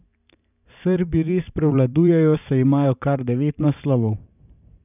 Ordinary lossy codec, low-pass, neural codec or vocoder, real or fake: none; 3.6 kHz; vocoder, 44.1 kHz, 128 mel bands every 512 samples, BigVGAN v2; fake